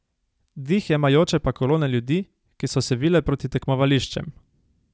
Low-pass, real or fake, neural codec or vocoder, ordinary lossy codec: none; real; none; none